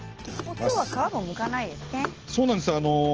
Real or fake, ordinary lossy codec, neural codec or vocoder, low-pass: real; Opus, 16 kbps; none; 7.2 kHz